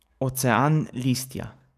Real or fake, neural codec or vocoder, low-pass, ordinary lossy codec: fake; codec, 44.1 kHz, 7.8 kbps, DAC; 14.4 kHz; none